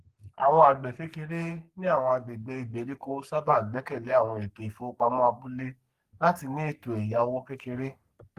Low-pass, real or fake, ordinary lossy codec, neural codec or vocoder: 14.4 kHz; fake; Opus, 24 kbps; codec, 44.1 kHz, 3.4 kbps, Pupu-Codec